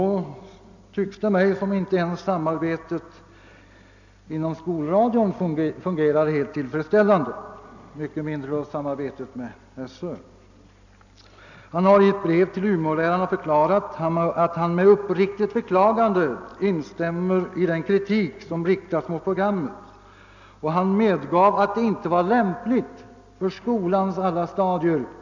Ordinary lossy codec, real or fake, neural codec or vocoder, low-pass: none; real; none; 7.2 kHz